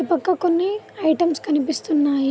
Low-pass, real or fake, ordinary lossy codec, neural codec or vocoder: none; real; none; none